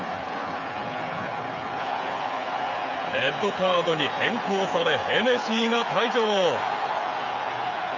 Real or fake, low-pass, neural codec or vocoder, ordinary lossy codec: fake; 7.2 kHz; codec, 16 kHz, 8 kbps, FreqCodec, smaller model; none